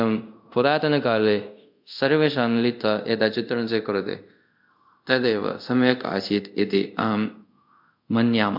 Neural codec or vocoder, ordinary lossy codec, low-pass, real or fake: codec, 24 kHz, 0.5 kbps, DualCodec; MP3, 48 kbps; 5.4 kHz; fake